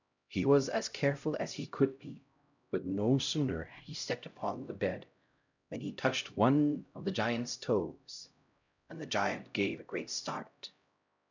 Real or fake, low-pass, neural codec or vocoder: fake; 7.2 kHz; codec, 16 kHz, 0.5 kbps, X-Codec, HuBERT features, trained on LibriSpeech